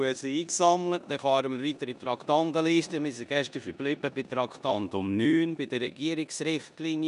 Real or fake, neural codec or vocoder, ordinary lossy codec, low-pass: fake; codec, 16 kHz in and 24 kHz out, 0.9 kbps, LongCat-Audio-Codec, four codebook decoder; none; 10.8 kHz